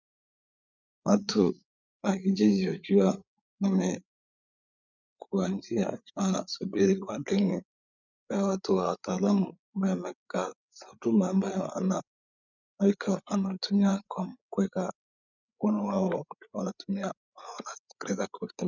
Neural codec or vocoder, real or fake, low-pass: codec, 16 kHz, 8 kbps, FreqCodec, larger model; fake; 7.2 kHz